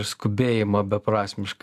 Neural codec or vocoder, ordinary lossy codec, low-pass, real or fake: none; AAC, 96 kbps; 14.4 kHz; real